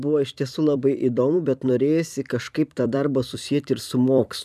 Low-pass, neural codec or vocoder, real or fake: 14.4 kHz; vocoder, 44.1 kHz, 128 mel bands every 256 samples, BigVGAN v2; fake